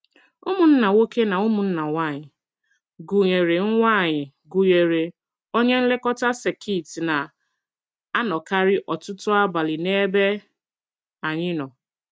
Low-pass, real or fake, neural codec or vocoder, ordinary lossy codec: none; real; none; none